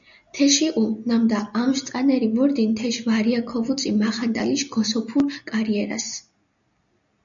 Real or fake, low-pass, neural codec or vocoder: real; 7.2 kHz; none